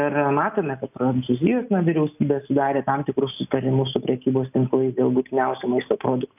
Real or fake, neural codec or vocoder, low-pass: real; none; 3.6 kHz